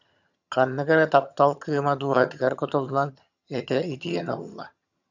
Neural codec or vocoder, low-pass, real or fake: vocoder, 22.05 kHz, 80 mel bands, HiFi-GAN; 7.2 kHz; fake